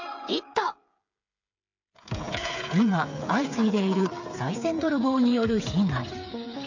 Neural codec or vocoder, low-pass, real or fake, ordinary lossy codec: codec, 16 kHz, 8 kbps, FreqCodec, smaller model; 7.2 kHz; fake; MP3, 64 kbps